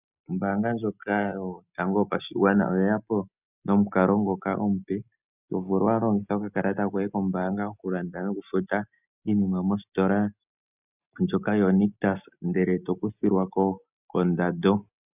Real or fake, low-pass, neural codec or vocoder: real; 3.6 kHz; none